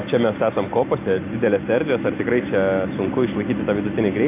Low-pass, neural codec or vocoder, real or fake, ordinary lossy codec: 3.6 kHz; none; real; AAC, 24 kbps